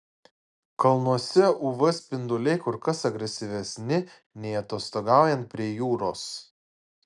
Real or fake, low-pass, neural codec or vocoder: real; 10.8 kHz; none